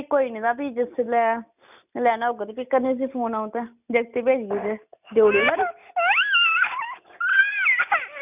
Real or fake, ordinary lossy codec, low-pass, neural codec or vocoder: real; none; 3.6 kHz; none